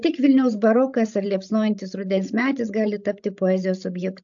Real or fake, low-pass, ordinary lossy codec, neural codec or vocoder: fake; 7.2 kHz; AAC, 64 kbps; codec, 16 kHz, 16 kbps, FreqCodec, larger model